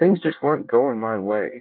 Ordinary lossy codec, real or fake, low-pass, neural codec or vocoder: MP3, 48 kbps; fake; 5.4 kHz; codec, 24 kHz, 1 kbps, SNAC